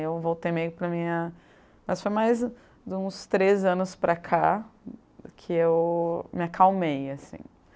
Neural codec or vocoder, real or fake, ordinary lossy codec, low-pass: none; real; none; none